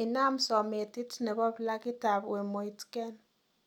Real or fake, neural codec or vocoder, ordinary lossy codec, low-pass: real; none; none; 19.8 kHz